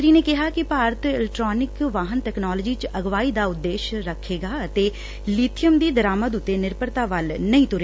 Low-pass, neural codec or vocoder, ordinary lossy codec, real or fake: none; none; none; real